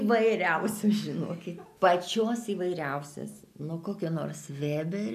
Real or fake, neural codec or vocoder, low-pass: real; none; 14.4 kHz